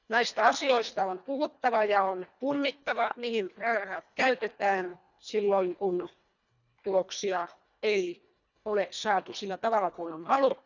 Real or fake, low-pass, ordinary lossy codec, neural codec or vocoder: fake; 7.2 kHz; none; codec, 24 kHz, 1.5 kbps, HILCodec